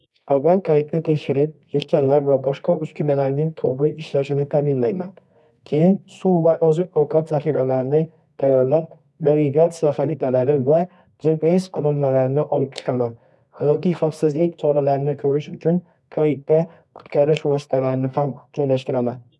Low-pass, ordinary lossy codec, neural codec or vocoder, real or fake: none; none; codec, 24 kHz, 0.9 kbps, WavTokenizer, medium music audio release; fake